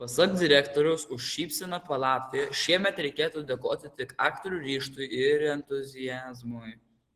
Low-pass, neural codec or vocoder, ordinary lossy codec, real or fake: 14.4 kHz; none; Opus, 16 kbps; real